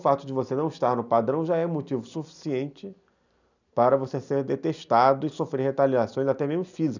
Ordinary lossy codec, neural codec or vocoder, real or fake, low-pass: none; none; real; 7.2 kHz